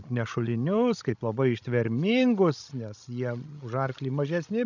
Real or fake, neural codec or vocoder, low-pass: fake; codec, 16 kHz, 16 kbps, FreqCodec, larger model; 7.2 kHz